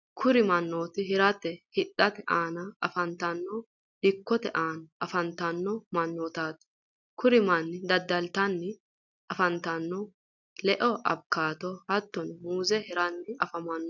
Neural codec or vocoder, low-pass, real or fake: none; 7.2 kHz; real